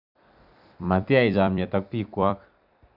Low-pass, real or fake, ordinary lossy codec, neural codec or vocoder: 5.4 kHz; fake; none; vocoder, 44.1 kHz, 128 mel bands every 256 samples, BigVGAN v2